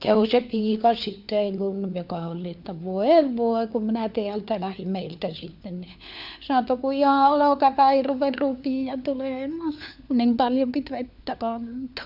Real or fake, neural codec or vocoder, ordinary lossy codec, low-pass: fake; codec, 16 kHz, 4 kbps, FunCodec, trained on LibriTTS, 50 frames a second; none; 5.4 kHz